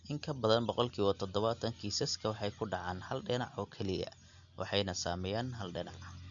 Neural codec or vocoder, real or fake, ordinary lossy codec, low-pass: none; real; none; 7.2 kHz